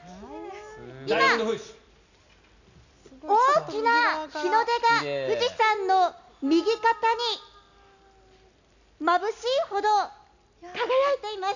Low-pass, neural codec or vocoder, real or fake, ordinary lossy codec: 7.2 kHz; none; real; none